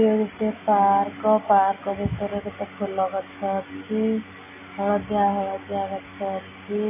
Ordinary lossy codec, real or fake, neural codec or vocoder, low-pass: MP3, 16 kbps; real; none; 3.6 kHz